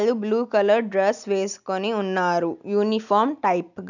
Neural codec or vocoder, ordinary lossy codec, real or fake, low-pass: none; none; real; 7.2 kHz